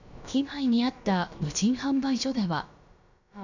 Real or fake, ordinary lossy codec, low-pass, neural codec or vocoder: fake; none; 7.2 kHz; codec, 16 kHz, about 1 kbps, DyCAST, with the encoder's durations